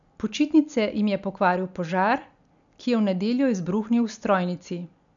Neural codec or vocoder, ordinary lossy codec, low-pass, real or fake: none; none; 7.2 kHz; real